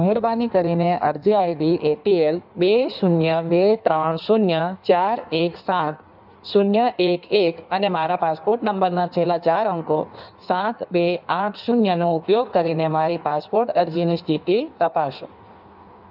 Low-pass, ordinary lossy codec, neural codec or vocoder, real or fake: 5.4 kHz; none; codec, 16 kHz in and 24 kHz out, 1.1 kbps, FireRedTTS-2 codec; fake